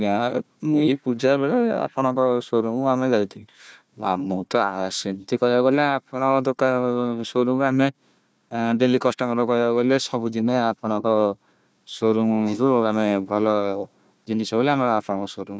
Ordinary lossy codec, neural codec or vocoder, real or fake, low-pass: none; codec, 16 kHz, 1 kbps, FunCodec, trained on Chinese and English, 50 frames a second; fake; none